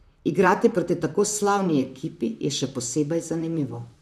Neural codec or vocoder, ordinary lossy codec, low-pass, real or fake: vocoder, 44.1 kHz, 128 mel bands, Pupu-Vocoder; none; 14.4 kHz; fake